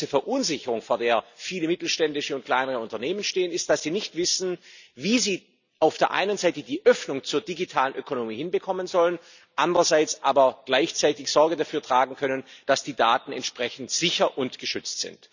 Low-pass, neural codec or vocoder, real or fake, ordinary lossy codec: 7.2 kHz; none; real; none